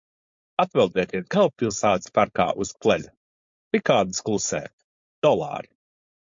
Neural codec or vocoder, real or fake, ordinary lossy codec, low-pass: codec, 16 kHz, 4.8 kbps, FACodec; fake; MP3, 48 kbps; 7.2 kHz